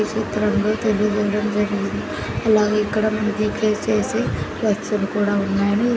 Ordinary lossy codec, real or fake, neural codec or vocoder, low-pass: none; real; none; none